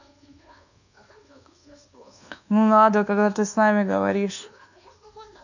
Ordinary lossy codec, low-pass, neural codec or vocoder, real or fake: none; 7.2 kHz; codec, 24 kHz, 1.2 kbps, DualCodec; fake